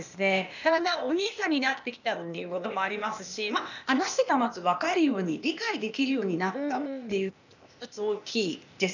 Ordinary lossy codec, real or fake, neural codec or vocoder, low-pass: none; fake; codec, 16 kHz, 0.8 kbps, ZipCodec; 7.2 kHz